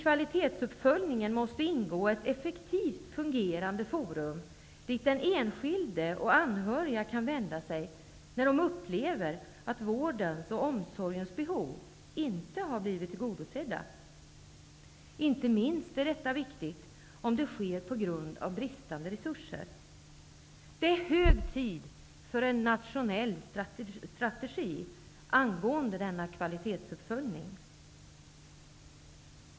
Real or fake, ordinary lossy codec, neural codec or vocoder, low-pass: real; none; none; none